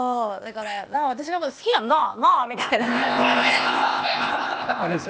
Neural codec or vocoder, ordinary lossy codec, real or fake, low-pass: codec, 16 kHz, 0.8 kbps, ZipCodec; none; fake; none